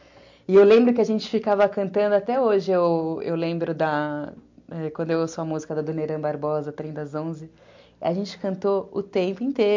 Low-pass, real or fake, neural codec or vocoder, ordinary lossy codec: 7.2 kHz; real; none; MP3, 48 kbps